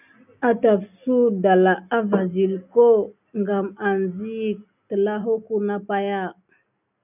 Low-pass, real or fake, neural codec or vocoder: 3.6 kHz; real; none